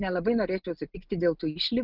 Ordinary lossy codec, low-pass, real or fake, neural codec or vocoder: Opus, 24 kbps; 5.4 kHz; real; none